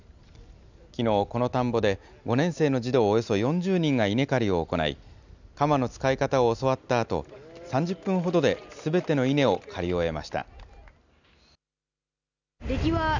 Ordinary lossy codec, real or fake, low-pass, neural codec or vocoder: none; real; 7.2 kHz; none